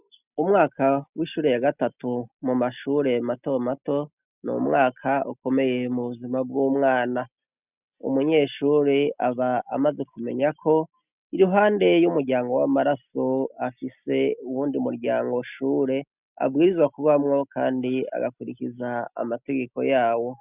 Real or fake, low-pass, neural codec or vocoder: real; 3.6 kHz; none